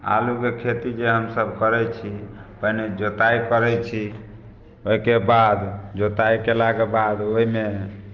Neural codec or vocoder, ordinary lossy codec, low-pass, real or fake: none; Opus, 24 kbps; 7.2 kHz; real